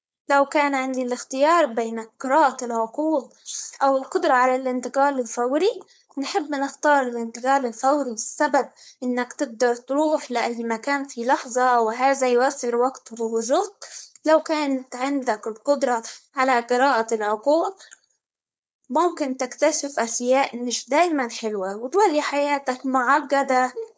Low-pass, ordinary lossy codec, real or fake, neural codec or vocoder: none; none; fake; codec, 16 kHz, 4.8 kbps, FACodec